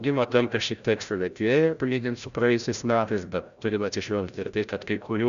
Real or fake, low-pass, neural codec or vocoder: fake; 7.2 kHz; codec, 16 kHz, 0.5 kbps, FreqCodec, larger model